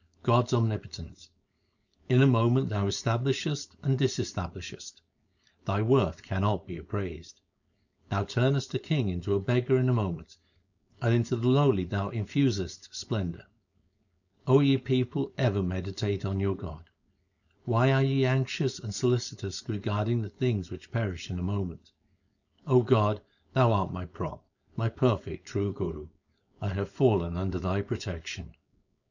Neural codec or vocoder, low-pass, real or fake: codec, 16 kHz, 4.8 kbps, FACodec; 7.2 kHz; fake